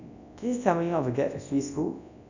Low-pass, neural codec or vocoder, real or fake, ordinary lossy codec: 7.2 kHz; codec, 24 kHz, 0.9 kbps, WavTokenizer, large speech release; fake; none